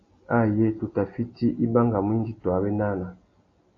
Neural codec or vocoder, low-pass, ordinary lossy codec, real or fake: none; 7.2 kHz; AAC, 32 kbps; real